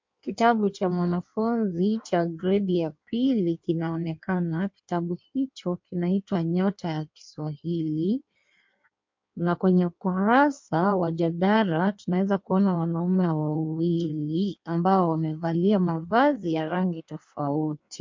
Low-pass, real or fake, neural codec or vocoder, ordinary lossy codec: 7.2 kHz; fake; codec, 16 kHz in and 24 kHz out, 1.1 kbps, FireRedTTS-2 codec; MP3, 48 kbps